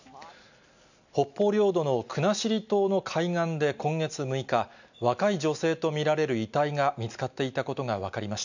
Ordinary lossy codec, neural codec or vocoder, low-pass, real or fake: none; none; 7.2 kHz; real